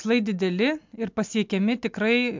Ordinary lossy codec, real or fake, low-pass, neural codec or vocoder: MP3, 64 kbps; real; 7.2 kHz; none